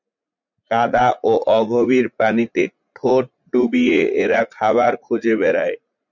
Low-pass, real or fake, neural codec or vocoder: 7.2 kHz; fake; vocoder, 44.1 kHz, 80 mel bands, Vocos